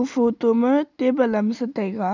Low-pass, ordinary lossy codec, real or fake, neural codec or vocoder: 7.2 kHz; none; real; none